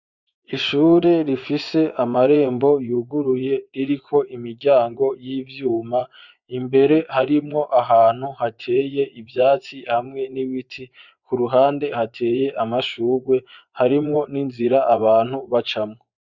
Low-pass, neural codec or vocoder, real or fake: 7.2 kHz; vocoder, 24 kHz, 100 mel bands, Vocos; fake